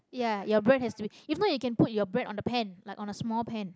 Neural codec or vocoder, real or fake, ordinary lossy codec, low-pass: none; real; none; none